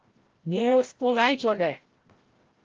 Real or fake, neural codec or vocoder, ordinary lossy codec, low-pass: fake; codec, 16 kHz, 0.5 kbps, FreqCodec, larger model; Opus, 16 kbps; 7.2 kHz